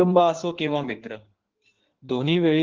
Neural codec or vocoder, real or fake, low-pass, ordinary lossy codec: codec, 16 kHz in and 24 kHz out, 1.1 kbps, FireRedTTS-2 codec; fake; 7.2 kHz; Opus, 16 kbps